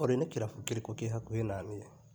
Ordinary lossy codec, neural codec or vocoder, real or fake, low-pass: none; none; real; none